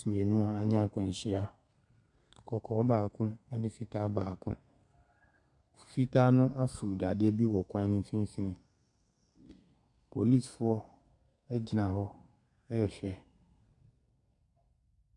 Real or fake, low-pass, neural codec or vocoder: fake; 10.8 kHz; codec, 32 kHz, 1.9 kbps, SNAC